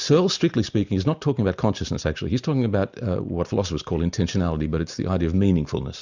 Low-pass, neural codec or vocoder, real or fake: 7.2 kHz; none; real